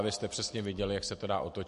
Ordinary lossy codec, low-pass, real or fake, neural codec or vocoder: MP3, 48 kbps; 14.4 kHz; real; none